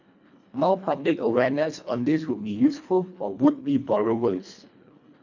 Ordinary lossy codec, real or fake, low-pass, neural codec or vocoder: none; fake; 7.2 kHz; codec, 24 kHz, 1.5 kbps, HILCodec